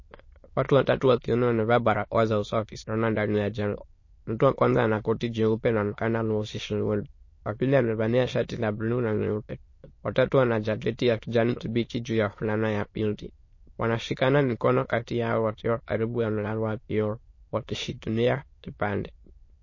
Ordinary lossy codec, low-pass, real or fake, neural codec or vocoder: MP3, 32 kbps; 7.2 kHz; fake; autoencoder, 22.05 kHz, a latent of 192 numbers a frame, VITS, trained on many speakers